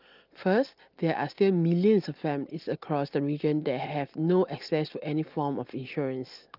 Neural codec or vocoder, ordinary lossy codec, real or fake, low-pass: none; Opus, 32 kbps; real; 5.4 kHz